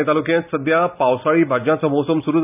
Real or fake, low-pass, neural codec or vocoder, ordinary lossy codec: real; 3.6 kHz; none; none